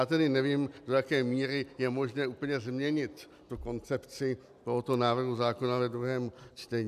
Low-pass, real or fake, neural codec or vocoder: 14.4 kHz; real; none